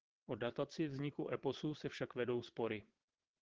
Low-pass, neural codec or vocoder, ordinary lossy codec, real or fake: 7.2 kHz; vocoder, 22.05 kHz, 80 mel bands, WaveNeXt; Opus, 16 kbps; fake